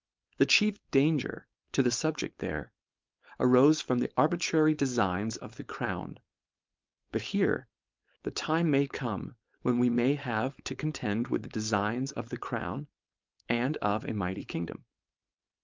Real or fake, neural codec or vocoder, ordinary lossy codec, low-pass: fake; codec, 16 kHz, 4.8 kbps, FACodec; Opus, 24 kbps; 7.2 kHz